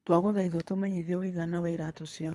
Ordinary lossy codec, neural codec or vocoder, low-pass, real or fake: none; codec, 24 kHz, 3 kbps, HILCodec; none; fake